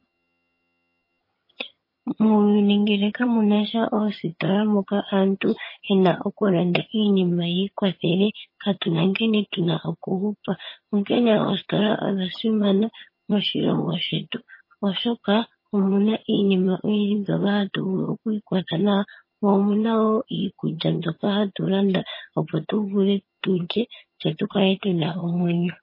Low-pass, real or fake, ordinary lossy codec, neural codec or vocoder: 5.4 kHz; fake; MP3, 24 kbps; vocoder, 22.05 kHz, 80 mel bands, HiFi-GAN